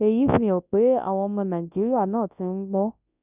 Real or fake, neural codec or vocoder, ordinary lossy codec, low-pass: fake; codec, 24 kHz, 0.9 kbps, WavTokenizer, large speech release; none; 3.6 kHz